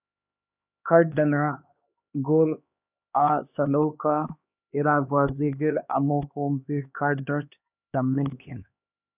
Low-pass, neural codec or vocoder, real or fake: 3.6 kHz; codec, 16 kHz, 2 kbps, X-Codec, HuBERT features, trained on LibriSpeech; fake